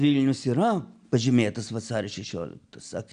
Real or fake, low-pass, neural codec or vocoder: real; 9.9 kHz; none